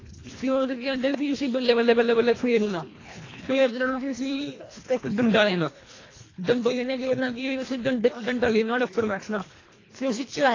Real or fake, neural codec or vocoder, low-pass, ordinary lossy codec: fake; codec, 24 kHz, 1.5 kbps, HILCodec; 7.2 kHz; AAC, 32 kbps